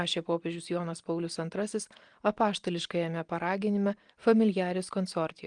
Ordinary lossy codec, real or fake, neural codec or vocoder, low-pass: Opus, 24 kbps; real; none; 9.9 kHz